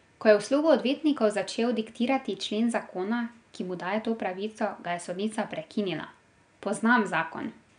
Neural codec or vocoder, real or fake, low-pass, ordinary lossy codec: none; real; 9.9 kHz; none